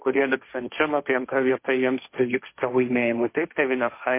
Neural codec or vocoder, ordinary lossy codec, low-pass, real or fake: codec, 16 kHz, 1.1 kbps, Voila-Tokenizer; MP3, 24 kbps; 3.6 kHz; fake